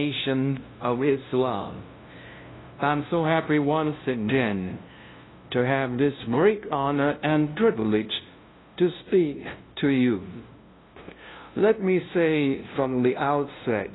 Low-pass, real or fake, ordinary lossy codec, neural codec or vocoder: 7.2 kHz; fake; AAC, 16 kbps; codec, 16 kHz, 0.5 kbps, FunCodec, trained on LibriTTS, 25 frames a second